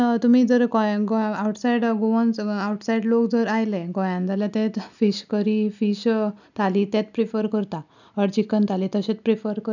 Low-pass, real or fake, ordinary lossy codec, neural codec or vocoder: 7.2 kHz; real; none; none